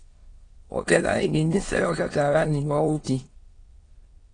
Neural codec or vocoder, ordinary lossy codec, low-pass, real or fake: autoencoder, 22.05 kHz, a latent of 192 numbers a frame, VITS, trained on many speakers; AAC, 32 kbps; 9.9 kHz; fake